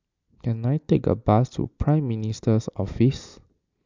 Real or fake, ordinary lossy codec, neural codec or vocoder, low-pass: real; MP3, 64 kbps; none; 7.2 kHz